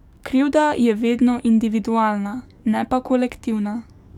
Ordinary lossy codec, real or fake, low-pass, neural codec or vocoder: none; fake; 19.8 kHz; codec, 44.1 kHz, 7.8 kbps, DAC